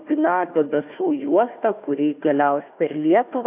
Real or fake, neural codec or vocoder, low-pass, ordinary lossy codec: fake; codec, 16 kHz, 1 kbps, FunCodec, trained on Chinese and English, 50 frames a second; 3.6 kHz; AAC, 32 kbps